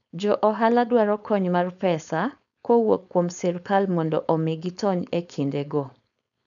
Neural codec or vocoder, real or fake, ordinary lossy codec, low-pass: codec, 16 kHz, 4.8 kbps, FACodec; fake; none; 7.2 kHz